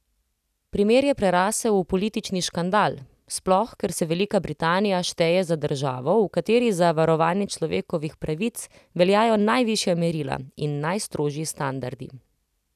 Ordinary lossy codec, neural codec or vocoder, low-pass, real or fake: none; none; 14.4 kHz; real